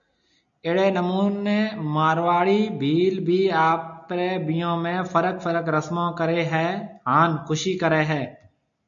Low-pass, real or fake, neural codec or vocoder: 7.2 kHz; real; none